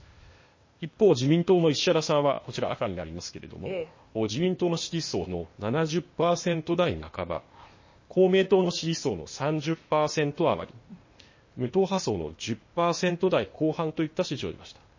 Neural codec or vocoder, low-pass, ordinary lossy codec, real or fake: codec, 16 kHz, 0.8 kbps, ZipCodec; 7.2 kHz; MP3, 32 kbps; fake